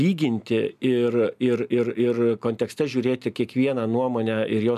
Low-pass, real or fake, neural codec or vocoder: 14.4 kHz; real; none